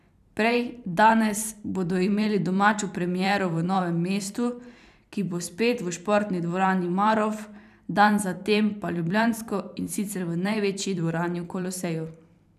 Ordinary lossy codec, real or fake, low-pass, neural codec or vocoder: none; fake; 14.4 kHz; vocoder, 44.1 kHz, 128 mel bands every 512 samples, BigVGAN v2